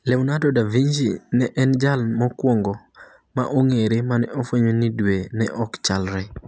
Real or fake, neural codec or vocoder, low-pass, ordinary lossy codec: real; none; none; none